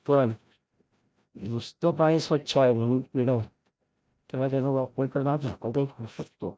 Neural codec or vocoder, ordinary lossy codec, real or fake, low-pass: codec, 16 kHz, 0.5 kbps, FreqCodec, larger model; none; fake; none